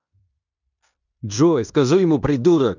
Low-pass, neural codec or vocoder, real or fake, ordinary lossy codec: 7.2 kHz; codec, 16 kHz in and 24 kHz out, 0.9 kbps, LongCat-Audio-Codec, fine tuned four codebook decoder; fake; none